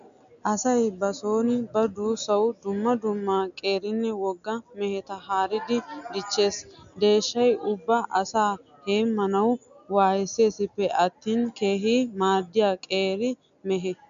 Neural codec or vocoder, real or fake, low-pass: none; real; 7.2 kHz